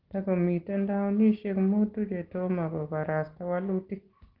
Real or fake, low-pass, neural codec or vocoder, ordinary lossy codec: real; 5.4 kHz; none; Opus, 16 kbps